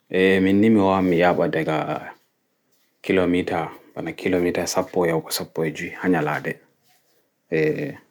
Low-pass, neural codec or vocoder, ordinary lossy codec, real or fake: 19.8 kHz; none; none; real